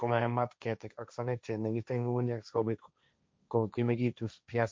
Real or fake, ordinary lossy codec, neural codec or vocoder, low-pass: fake; none; codec, 16 kHz, 1.1 kbps, Voila-Tokenizer; none